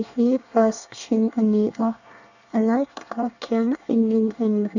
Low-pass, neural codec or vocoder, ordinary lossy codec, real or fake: 7.2 kHz; codec, 24 kHz, 1 kbps, SNAC; none; fake